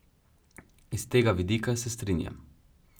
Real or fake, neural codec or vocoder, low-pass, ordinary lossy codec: real; none; none; none